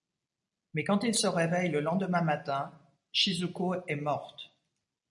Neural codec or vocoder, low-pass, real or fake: none; 10.8 kHz; real